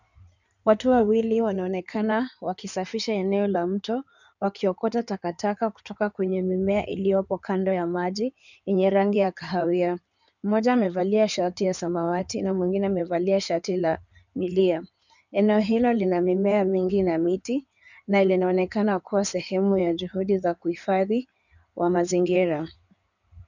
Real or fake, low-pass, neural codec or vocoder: fake; 7.2 kHz; codec, 16 kHz in and 24 kHz out, 2.2 kbps, FireRedTTS-2 codec